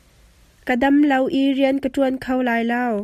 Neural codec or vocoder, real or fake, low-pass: none; real; 14.4 kHz